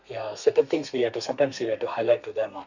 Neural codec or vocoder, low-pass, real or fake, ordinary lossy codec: codec, 32 kHz, 1.9 kbps, SNAC; 7.2 kHz; fake; none